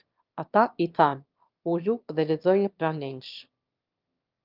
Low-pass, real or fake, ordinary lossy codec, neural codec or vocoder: 5.4 kHz; fake; Opus, 24 kbps; autoencoder, 22.05 kHz, a latent of 192 numbers a frame, VITS, trained on one speaker